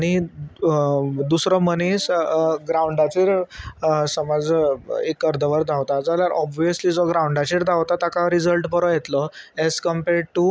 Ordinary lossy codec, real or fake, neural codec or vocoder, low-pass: none; real; none; none